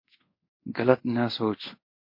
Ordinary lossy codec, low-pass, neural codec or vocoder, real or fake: MP3, 32 kbps; 5.4 kHz; codec, 24 kHz, 0.5 kbps, DualCodec; fake